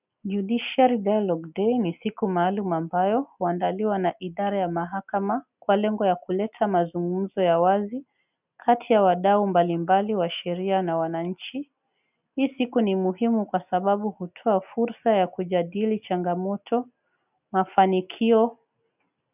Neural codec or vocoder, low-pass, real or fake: none; 3.6 kHz; real